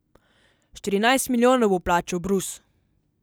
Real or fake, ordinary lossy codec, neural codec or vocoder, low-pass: real; none; none; none